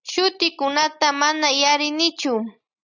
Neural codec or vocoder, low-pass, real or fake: none; 7.2 kHz; real